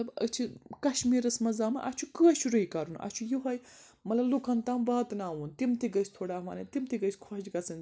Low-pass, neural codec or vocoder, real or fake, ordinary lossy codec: none; none; real; none